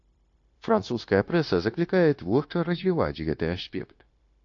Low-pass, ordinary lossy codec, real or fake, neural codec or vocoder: 7.2 kHz; AAC, 48 kbps; fake; codec, 16 kHz, 0.9 kbps, LongCat-Audio-Codec